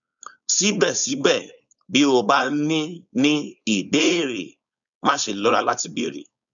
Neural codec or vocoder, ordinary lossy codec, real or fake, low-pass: codec, 16 kHz, 4.8 kbps, FACodec; none; fake; 7.2 kHz